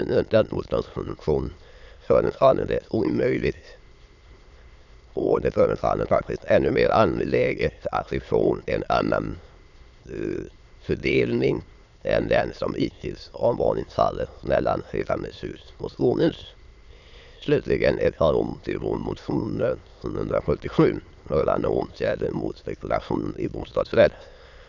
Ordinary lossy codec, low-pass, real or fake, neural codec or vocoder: none; 7.2 kHz; fake; autoencoder, 22.05 kHz, a latent of 192 numbers a frame, VITS, trained on many speakers